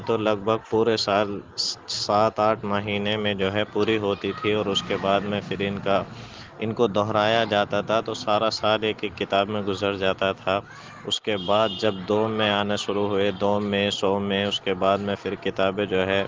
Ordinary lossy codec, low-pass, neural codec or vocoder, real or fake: Opus, 16 kbps; 7.2 kHz; none; real